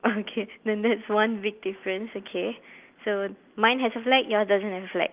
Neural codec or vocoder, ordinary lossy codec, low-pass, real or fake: none; Opus, 32 kbps; 3.6 kHz; real